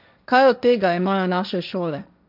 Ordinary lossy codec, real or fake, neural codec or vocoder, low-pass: none; fake; codec, 16 kHz, 1.1 kbps, Voila-Tokenizer; 5.4 kHz